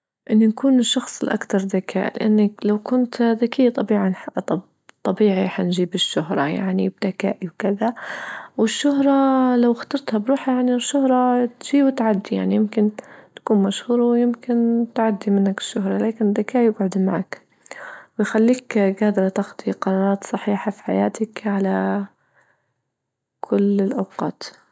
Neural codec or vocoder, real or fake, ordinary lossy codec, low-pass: none; real; none; none